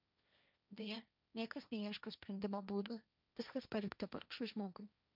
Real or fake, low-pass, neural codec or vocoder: fake; 5.4 kHz; codec, 16 kHz, 1.1 kbps, Voila-Tokenizer